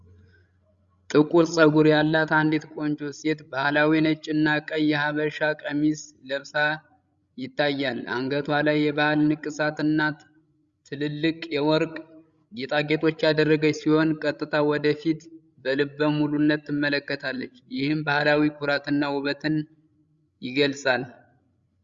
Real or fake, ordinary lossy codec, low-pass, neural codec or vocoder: fake; Opus, 64 kbps; 7.2 kHz; codec, 16 kHz, 16 kbps, FreqCodec, larger model